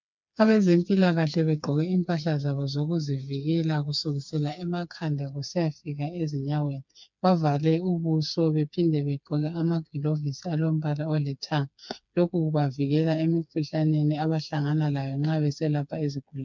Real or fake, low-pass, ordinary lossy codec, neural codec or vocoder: fake; 7.2 kHz; MP3, 64 kbps; codec, 16 kHz, 4 kbps, FreqCodec, smaller model